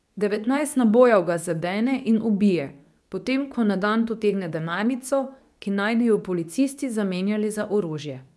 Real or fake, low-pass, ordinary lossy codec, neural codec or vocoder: fake; none; none; codec, 24 kHz, 0.9 kbps, WavTokenizer, medium speech release version 2